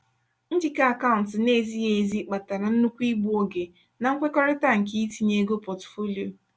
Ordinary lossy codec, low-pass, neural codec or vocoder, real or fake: none; none; none; real